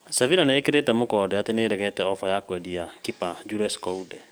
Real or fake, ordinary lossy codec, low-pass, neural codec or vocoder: fake; none; none; codec, 44.1 kHz, 7.8 kbps, DAC